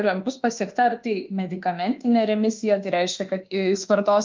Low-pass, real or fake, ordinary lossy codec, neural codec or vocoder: 7.2 kHz; fake; Opus, 32 kbps; codec, 24 kHz, 1.2 kbps, DualCodec